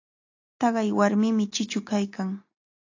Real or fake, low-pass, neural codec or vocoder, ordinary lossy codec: real; 7.2 kHz; none; AAC, 48 kbps